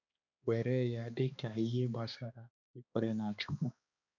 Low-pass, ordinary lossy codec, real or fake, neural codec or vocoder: 7.2 kHz; none; fake; codec, 16 kHz, 2 kbps, X-Codec, HuBERT features, trained on balanced general audio